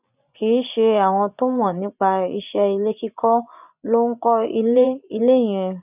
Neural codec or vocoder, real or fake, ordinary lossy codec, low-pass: vocoder, 24 kHz, 100 mel bands, Vocos; fake; none; 3.6 kHz